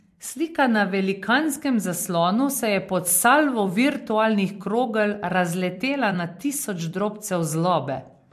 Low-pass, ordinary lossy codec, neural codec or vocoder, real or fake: 14.4 kHz; MP3, 64 kbps; vocoder, 44.1 kHz, 128 mel bands every 256 samples, BigVGAN v2; fake